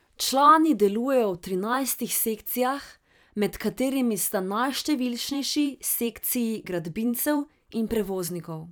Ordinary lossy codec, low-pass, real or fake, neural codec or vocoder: none; none; fake; vocoder, 44.1 kHz, 128 mel bands every 512 samples, BigVGAN v2